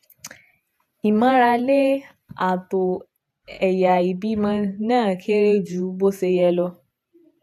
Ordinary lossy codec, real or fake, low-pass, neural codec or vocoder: none; fake; 14.4 kHz; vocoder, 48 kHz, 128 mel bands, Vocos